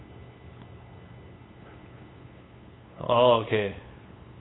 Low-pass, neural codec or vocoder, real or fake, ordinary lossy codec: 7.2 kHz; codec, 16 kHz, 8 kbps, FunCodec, trained on LibriTTS, 25 frames a second; fake; AAC, 16 kbps